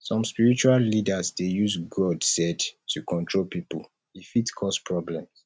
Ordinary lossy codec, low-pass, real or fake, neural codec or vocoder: none; none; real; none